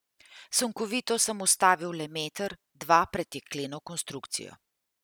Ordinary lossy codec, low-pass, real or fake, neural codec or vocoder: none; none; real; none